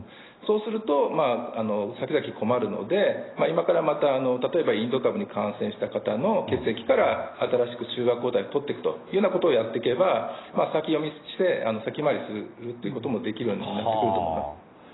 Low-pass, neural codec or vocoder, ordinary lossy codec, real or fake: 7.2 kHz; none; AAC, 16 kbps; real